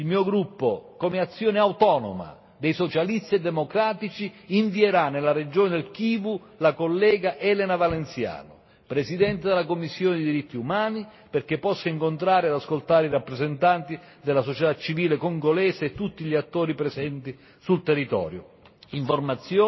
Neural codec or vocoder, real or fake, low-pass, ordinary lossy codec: none; real; 7.2 kHz; MP3, 24 kbps